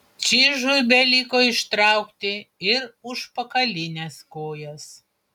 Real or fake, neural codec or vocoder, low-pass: real; none; 19.8 kHz